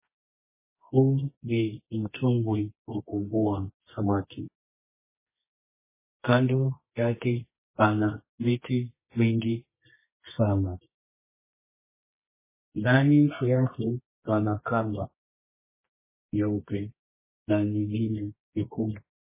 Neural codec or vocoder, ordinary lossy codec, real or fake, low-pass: codec, 24 kHz, 0.9 kbps, WavTokenizer, medium music audio release; MP3, 16 kbps; fake; 3.6 kHz